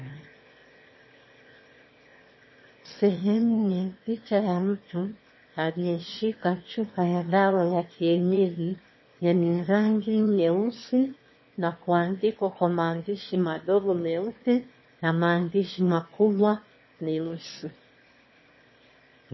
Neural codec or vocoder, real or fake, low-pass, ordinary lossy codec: autoencoder, 22.05 kHz, a latent of 192 numbers a frame, VITS, trained on one speaker; fake; 7.2 kHz; MP3, 24 kbps